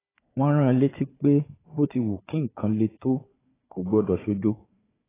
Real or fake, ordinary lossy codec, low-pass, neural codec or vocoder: fake; AAC, 16 kbps; 3.6 kHz; codec, 16 kHz, 4 kbps, FunCodec, trained on Chinese and English, 50 frames a second